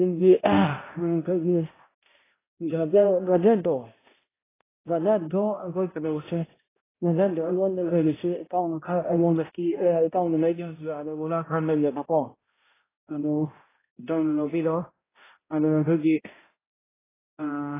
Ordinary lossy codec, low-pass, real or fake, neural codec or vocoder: AAC, 16 kbps; 3.6 kHz; fake; codec, 16 kHz, 0.5 kbps, X-Codec, HuBERT features, trained on balanced general audio